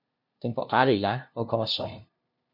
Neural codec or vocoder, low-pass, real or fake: codec, 16 kHz, 0.5 kbps, FunCodec, trained on LibriTTS, 25 frames a second; 5.4 kHz; fake